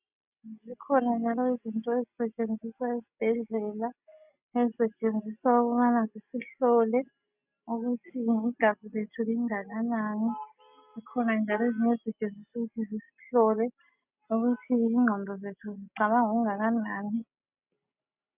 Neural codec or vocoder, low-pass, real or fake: none; 3.6 kHz; real